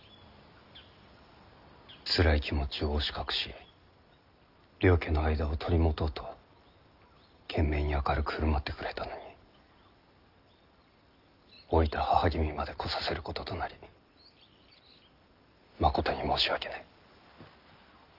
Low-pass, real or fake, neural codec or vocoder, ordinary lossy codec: 5.4 kHz; real; none; Opus, 32 kbps